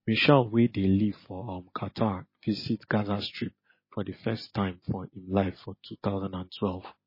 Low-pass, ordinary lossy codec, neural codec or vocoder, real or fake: 5.4 kHz; MP3, 24 kbps; none; real